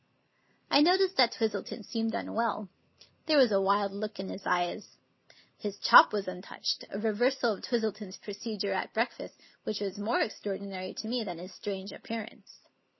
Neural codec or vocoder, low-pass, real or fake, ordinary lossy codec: none; 7.2 kHz; real; MP3, 24 kbps